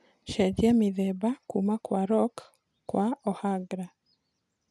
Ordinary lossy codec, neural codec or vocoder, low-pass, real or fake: none; none; none; real